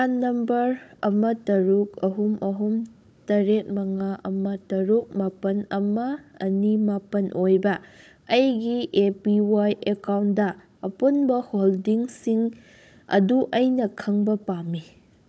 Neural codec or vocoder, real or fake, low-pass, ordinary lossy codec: codec, 16 kHz, 16 kbps, FunCodec, trained on Chinese and English, 50 frames a second; fake; none; none